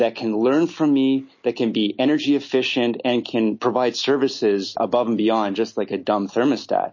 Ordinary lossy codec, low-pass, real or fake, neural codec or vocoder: MP3, 32 kbps; 7.2 kHz; real; none